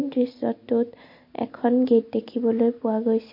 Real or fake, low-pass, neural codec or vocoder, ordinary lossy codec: real; 5.4 kHz; none; MP3, 32 kbps